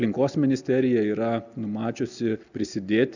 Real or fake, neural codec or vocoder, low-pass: real; none; 7.2 kHz